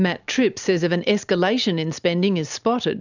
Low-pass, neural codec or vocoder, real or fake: 7.2 kHz; none; real